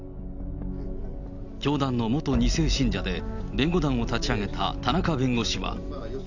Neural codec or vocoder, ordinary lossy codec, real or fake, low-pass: none; none; real; 7.2 kHz